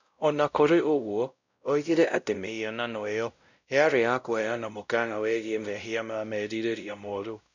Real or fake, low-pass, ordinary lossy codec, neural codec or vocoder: fake; 7.2 kHz; none; codec, 16 kHz, 0.5 kbps, X-Codec, WavLM features, trained on Multilingual LibriSpeech